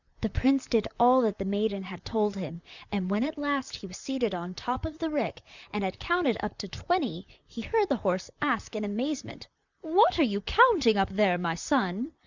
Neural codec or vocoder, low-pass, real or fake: vocoder, 44.1 kHz, 128 mel bands, Pupu-Vocoder; 7.2 kHz; fake